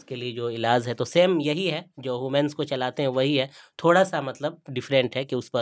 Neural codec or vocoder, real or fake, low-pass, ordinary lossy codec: none; real; none; none